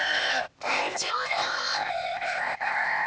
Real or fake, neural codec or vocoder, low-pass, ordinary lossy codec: fake; codec, 16 kHz, 0.8 kbps, ZipCodec; none; none